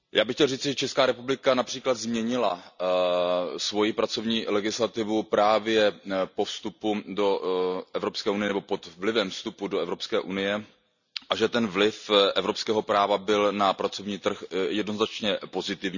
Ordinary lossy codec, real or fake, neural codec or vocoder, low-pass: none; real; none; 7.2 kHz